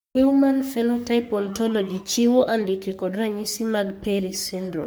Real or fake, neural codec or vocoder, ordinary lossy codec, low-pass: fake; codec, 44.1 kHz, 3.4 kbps, Pupu-Codec; none; none